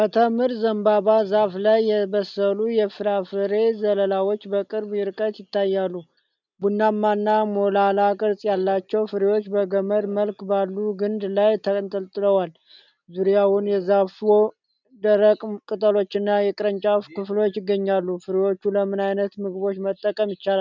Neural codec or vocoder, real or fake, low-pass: none; real; 7.2 kHz